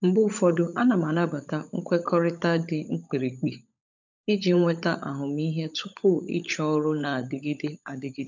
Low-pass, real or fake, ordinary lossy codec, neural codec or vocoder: 7.2 kHz; fake; none; codec, 16 kHz, 16 kbps, FunCodec, trained on LibriTTS, 50 frames a second